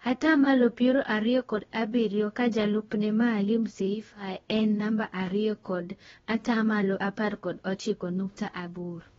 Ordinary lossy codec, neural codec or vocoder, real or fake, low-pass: AAC, 24 kbps; codec, 16 kHz, about 1 kbps, DyCAST, with the encoder's durations; fake; 7.2 kHz